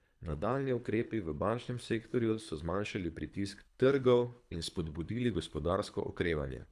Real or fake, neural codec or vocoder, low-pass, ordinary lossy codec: fake; codec, 24 kHz, 3 kbps, HILCodec; none; none